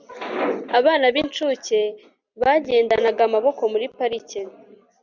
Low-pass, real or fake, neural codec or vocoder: 7.2 kHz; real; none